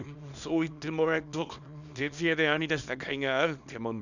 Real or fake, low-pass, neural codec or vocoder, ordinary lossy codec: fake; 7.2 kHz; codec, 24 kHz, 0.9 kbps, WavTokenizer, small release; none